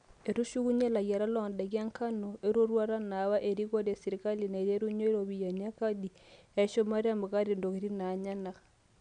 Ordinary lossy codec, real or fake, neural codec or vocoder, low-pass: none; real; none; 9.9 kHz